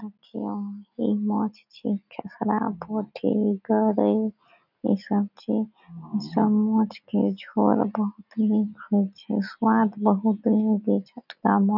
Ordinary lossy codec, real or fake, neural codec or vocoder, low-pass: none; real; none; 5.4 kHz